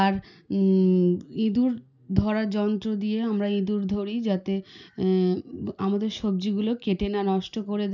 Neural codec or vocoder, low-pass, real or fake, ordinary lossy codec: none; 7.2 kHz; real; none